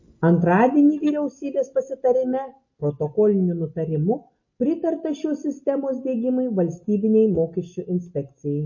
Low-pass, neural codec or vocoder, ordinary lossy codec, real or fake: 7.2 kHz; none; MP3, 32 kbps; real